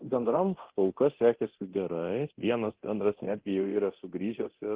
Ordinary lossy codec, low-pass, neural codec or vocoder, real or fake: Opus, 16 kbps; 3.6 kHz; codec, 24 kHz, 0.9 kbps, DualCodec; fake